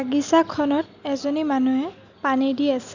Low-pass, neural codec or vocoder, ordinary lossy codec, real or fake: 7.2 kHz; none; none; real